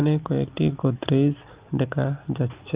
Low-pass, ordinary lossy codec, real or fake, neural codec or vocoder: 3.6 kHz; Opus, 32 kbps; real; none